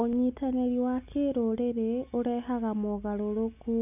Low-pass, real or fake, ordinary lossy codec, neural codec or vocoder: 3.6 kHz; real; none; none